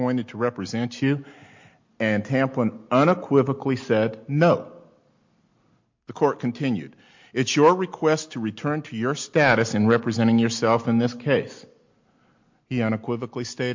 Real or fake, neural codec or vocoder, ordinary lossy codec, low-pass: real; none; MP3, 48 kbps; 7.2 kHz